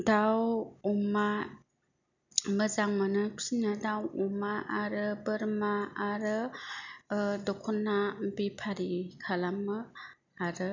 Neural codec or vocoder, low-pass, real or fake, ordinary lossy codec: none; 7.2 kHz; real; none